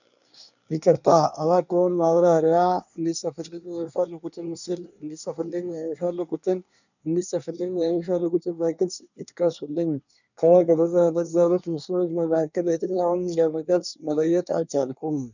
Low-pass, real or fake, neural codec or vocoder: 7.2 kHz; fake; codec, 24 kHz, 1 kbps, SNAC